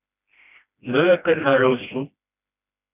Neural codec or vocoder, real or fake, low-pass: codec, 16 kHz, 1 kbps, FreqCodec, smaller model; fake; 3.6 kHz